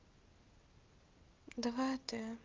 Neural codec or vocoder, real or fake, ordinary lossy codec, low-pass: none; real; Opus, 32 kbps; 7.2 kHz